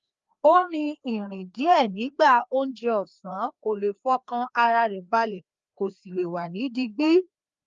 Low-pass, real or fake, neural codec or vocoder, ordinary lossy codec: 7.2 kHz; fake; codec, 16 kHz, 2 kbps, FreqCodec, larger model; Opus, 32 kbps